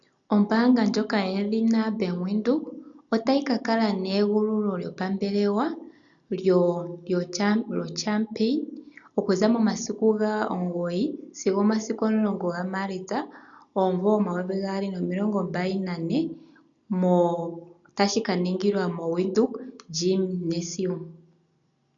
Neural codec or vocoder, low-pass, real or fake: none; 7.2 kHz; real